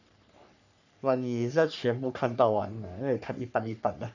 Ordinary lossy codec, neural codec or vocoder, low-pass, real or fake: none; codec, 44.1 kHz, 3.4 kbps, Pupu-Codec; 7.2 kHz; fake